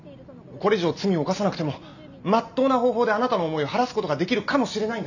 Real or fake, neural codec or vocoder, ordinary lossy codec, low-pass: real; none; none; 7.2 kHz